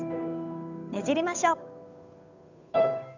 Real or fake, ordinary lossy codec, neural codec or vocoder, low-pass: fake; none; codec, 16 kHz, 8 kbps, FunCodec, trained on Chinese and English, 25 frames a second; 7.2 kHz